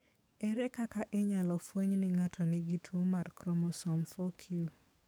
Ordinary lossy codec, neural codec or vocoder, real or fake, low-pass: none; codec, 44.1 kHz, 7.8 kbps, DAC; fake; none